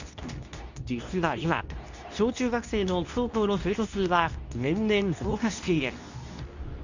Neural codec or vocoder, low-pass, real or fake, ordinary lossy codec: codec, 24 kHz, 0.9 kbps, WavTokenizer, medium speech release version 2; 7.2 kHz; fake; none